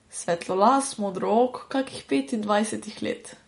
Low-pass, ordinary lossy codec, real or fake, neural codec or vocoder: 10.8 kHz; MP3, 48 kbps; real; none